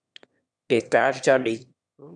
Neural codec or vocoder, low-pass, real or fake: autoencoder, 22.05 kHz, a latent of 192 numbers a frame, VITS, trained on one speaker; 9.9 kHz; fake